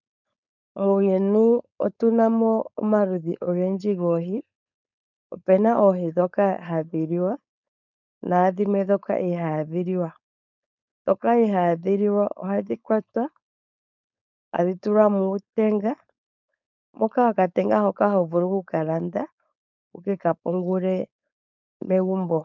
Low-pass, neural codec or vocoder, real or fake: 7.2 kHz; codec, 16 kHz, 4.8 kbps, FACodec; fake